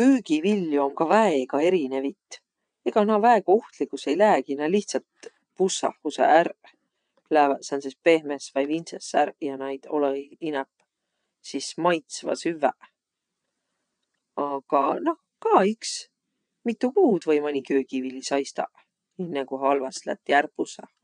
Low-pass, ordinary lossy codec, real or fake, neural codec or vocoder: 9.9 kHz; none; fake; vocoder, 22.05 kHz, 80 mel bands, WaveNeXt